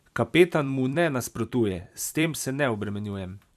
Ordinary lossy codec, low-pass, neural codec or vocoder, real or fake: none; 14.4 kHz; vocoder, 44.1 kHz, 128 mel bands every 256 samples, BigVGAN v2; fake